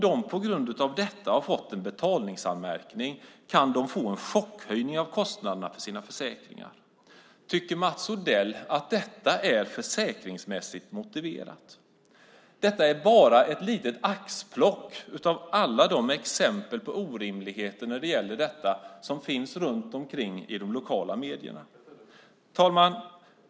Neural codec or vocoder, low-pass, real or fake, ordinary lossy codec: none; none; real; none